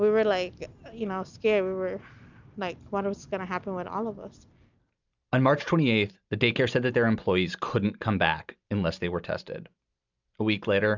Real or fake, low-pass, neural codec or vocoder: real; 7.2 kHz; none